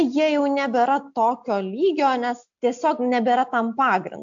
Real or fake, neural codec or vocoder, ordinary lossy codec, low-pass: real; none; AAC, 64 kbps; 7.2 kHz